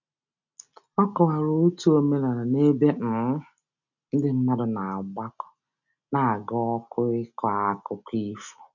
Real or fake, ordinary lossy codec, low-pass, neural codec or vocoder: real; none; 7.2 kHz; none